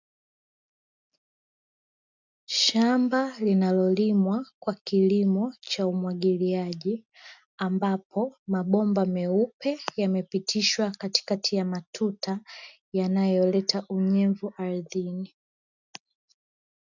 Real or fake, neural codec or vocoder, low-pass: real; none; 7.2 kHz